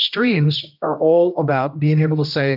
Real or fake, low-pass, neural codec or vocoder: fake; 5.4 kHz; codec, 16 kHz, 1 kbps, X-Codec, HuBERT features, trained on general audio